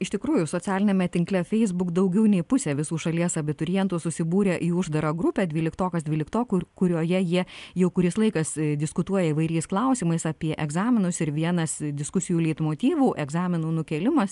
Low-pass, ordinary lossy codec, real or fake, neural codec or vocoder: 10.8 kHz; MP3, 96 kbps; real; none